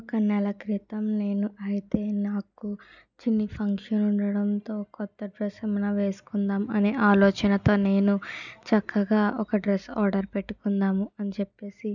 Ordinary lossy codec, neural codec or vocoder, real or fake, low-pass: none; none; real; 7.2 kHz